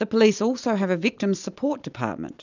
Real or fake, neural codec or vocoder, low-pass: real; none; 7.2 kHz